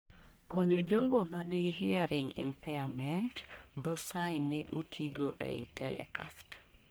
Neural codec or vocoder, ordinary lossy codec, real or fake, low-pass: codec, 44.1 kHz, 1.7 kbps, Pupu-Codec; none; fake; none